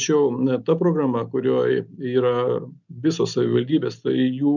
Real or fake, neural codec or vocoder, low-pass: real; none; 7.2 kHz